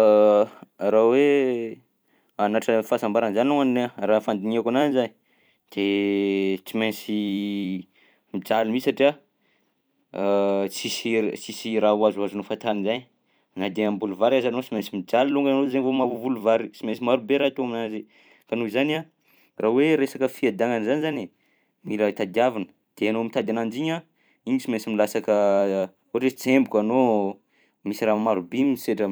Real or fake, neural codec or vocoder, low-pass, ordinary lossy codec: fake; vocoder, 44.1 kHz, 128 mel bands every 256 samples, BigVGAN v2; none; none